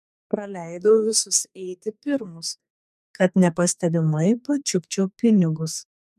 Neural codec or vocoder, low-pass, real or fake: codec, 44.1 kHz, 2.6 kbps, SNAC; 14.4 kHz; fake